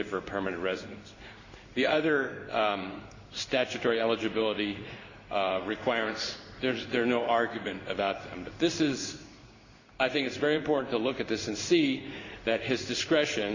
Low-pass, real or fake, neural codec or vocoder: 7.2 kHz; fake; codec, 16 kHz in and 24 kHz out, 1 kbps, XY-Tokenizer